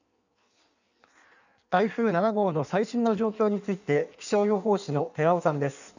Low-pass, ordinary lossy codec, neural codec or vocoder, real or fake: 7.2 kHz; none; codec, 16 kHz in and 24 kHz out, 1.1 kbps, FireRedTTS-2 codec; fake